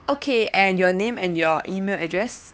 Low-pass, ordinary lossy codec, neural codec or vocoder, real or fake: none; none; codec, 16 kHz, 2 kbps, X-Codec, HuBERT features, trained on LibriSpeech; fake